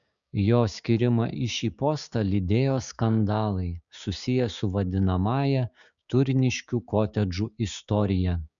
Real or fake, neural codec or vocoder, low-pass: fake; codec, 16 kHz, 6 kbps, DAC; 7.2 kHz